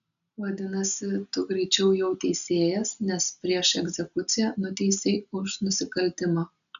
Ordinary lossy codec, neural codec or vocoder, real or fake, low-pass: AAC, 96 kbps; none; real; 7.2 kHz